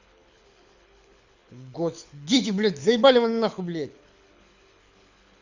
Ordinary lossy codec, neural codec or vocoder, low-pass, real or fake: Opus, 64 kbps; codec, 24 kHz, 6 kbps, HILCodec; 7.2 kHz; fake